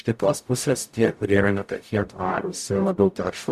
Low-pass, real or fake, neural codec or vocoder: 14.4 kHz; fake; codec, 44.1 kHz, 0.9 kbps, DAC